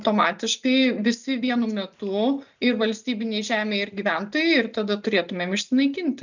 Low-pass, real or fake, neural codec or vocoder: 7.2 kHz; real; none